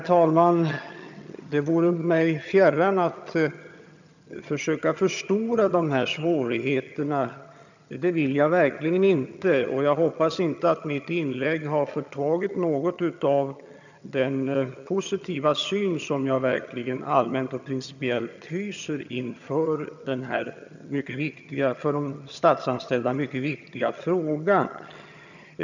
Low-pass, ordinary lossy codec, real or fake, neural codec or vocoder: 7.2 kHz; none; fake; vocoder, 22.05 kHz, 80 mel bands, HiFi-GAN